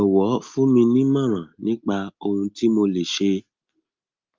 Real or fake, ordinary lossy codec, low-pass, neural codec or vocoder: real; Opus, 24 kbps; 7.2 kHz; none